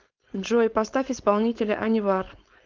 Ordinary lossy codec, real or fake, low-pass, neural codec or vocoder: Opus, 32 kbps; fake; 7.2 kHz; codec, 16 kHz, 4.8 kbps, FACodec